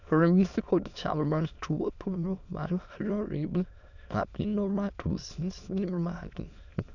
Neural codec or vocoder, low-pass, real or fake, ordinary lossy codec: autoencoder, 22.05 kHz, a latent of 192 numbers a frame, VITS, trained on many speakers; 7.2 kHz; fake; none